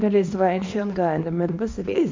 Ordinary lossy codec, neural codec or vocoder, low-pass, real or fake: MP3, 48 kbps; codec, 24 kHz, 0.9 kbps, WavTokenizer, small release; 7.2 kHz; fake